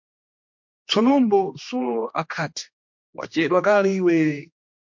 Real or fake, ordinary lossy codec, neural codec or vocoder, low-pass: fake; MP3, 48 kbps; codec, 16 kHz, 2 kbps, X-Codec, HuBERT features, trained on general audio; 7.2 kHz